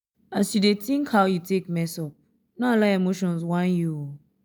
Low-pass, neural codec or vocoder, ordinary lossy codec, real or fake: none; none; none; real